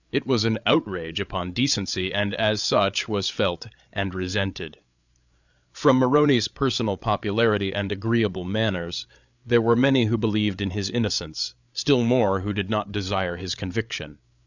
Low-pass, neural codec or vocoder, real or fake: 7.2 kHz; codec, 16 kHz, 8 kbps, FreqCodec, larger model; fake